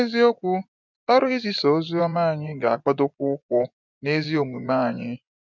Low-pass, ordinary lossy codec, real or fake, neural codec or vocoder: 7.2 kHz; none; fake; vocoder, 24 kHz, 100 mel bands, Vocos